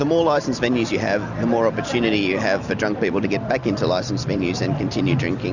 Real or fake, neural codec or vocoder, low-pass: real; none; 7.2 kHz